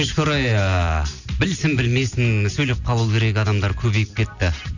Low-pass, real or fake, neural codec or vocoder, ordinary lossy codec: 7.2 kHz; real; none; none